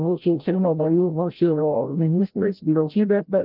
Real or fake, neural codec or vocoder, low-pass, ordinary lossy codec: fake; codec, 16 kHz, 0.5 kbps, FreqCodec, larger model; 5.4 kHz; Opus, 32 kbps